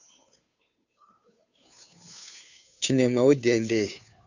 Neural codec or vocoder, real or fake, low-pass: codec, 16 kHz, 2 kbps, FunCodec, trained on Chinese and English, 25 frames a second; fake; 7.2 kHz